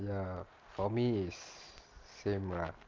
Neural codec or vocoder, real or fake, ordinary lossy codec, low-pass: none; real; Opus, 24 kbps; 7.2 kHz